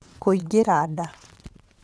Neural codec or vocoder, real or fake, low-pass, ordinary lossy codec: vocoder, 22.05 kHz, 80 mel bands, WaveNeXt; fake; none; none